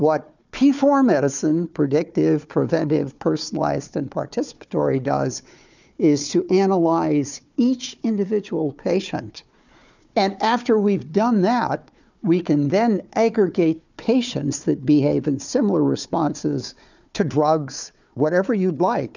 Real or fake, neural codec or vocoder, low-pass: fake; codec, 16 kHz, 4 kbps, FunCodec, trained on Chinese and English, 50 frames a second; 7.2 kHz